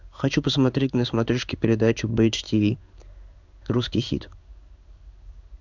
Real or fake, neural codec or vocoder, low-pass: fake; codec, 16 kHz in and 24 kHz out, 1 kbps, XY-Tokenizer; 7.2 kHz